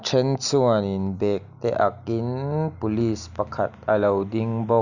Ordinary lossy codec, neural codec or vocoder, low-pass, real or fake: none; none; 7.2 kHz; real